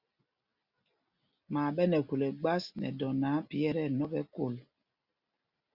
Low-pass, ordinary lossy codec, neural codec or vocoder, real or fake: 5.4 kHz; Opus, 64 kbps; none; real